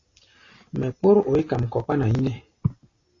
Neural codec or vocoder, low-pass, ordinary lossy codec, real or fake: none; 7.2 kHz; AAC, 32 kbps; real